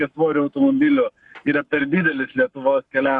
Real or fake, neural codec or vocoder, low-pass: fake; codec, 44.1 kHz, 7.8 kbps, Pupu-Codec; 10.8 kHz